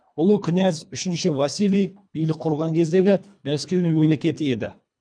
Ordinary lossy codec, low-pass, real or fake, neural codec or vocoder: none; 9.9 kHz; fake; codec, 24 kHz, 1.5 kbps, HILCodec